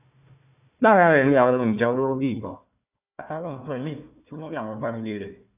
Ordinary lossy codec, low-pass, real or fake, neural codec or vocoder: none; 3.6 kHz; fake; codec, 16 kHz, 1 kbps, FunCodec, trained on Chinese and English, 50 frames a second